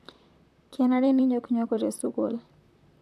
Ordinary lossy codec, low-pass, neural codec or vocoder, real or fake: none; 14.4 kHz; vocoder, 44.1 kHz, 128 mel bands, Pupu-Vocoder; fake